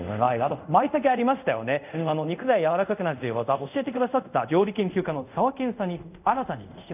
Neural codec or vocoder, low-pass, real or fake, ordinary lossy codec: codec, 24 kHz, 0.5 kbps, DualCodec; 3.6 kHz; fake; none